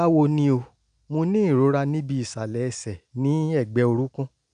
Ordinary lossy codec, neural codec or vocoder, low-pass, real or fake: none; none; 9.9 kHz; real